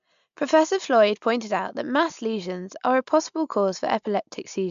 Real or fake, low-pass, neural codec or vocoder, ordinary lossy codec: real; 7.2 kHz; none; MP3, 64 kbps